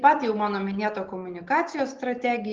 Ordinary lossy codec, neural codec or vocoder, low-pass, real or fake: Opus, 16 kbps; none; 7.2 kHz; real